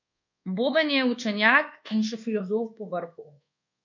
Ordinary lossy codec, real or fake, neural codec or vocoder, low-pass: none; fake; codec, 24 kHz, 1.2 kbps, DualCodec; 7.2 kHz